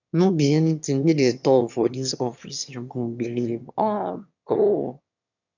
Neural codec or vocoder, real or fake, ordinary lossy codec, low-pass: autoencoder, 22.05 kHz, a latent of 192 numbers a frame, VITS, trained on one speaker; fake; none; 7.2 kHz